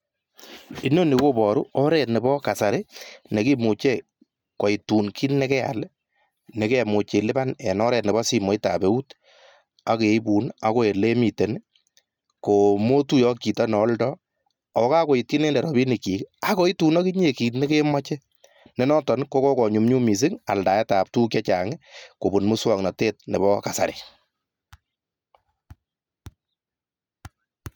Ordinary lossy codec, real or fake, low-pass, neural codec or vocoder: none; real; 19.8 kHz; none